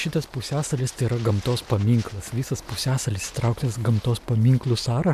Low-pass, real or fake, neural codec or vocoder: 14.4 kHz; real; none